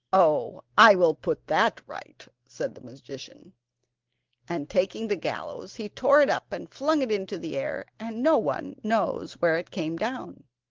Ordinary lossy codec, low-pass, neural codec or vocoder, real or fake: Opus, 16 kbps; 7.2 kHz; none; real